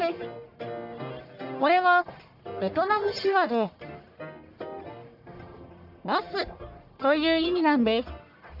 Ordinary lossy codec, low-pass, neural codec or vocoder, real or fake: AAC, 48 kbps; 5.4 kHz; codec, 44.1 kHz, 1.7 kbps, Pupu-Codec; fake